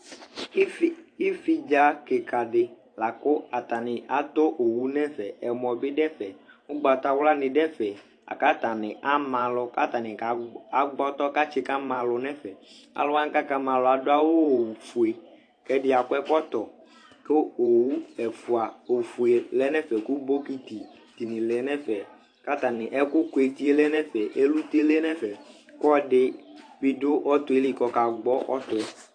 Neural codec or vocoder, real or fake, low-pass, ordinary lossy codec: vocoder, 44.1 kHz, 128 mel bands every 512 samples, BigVGAN v2; fake; 9.9 kHz; AAC, 48 kbps